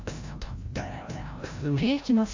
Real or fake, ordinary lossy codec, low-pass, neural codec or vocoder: fake; AAC, 48 kbps; 7.2 kHz; codec, 16 kHz, 0.5 kbps, FreqCodec, larger model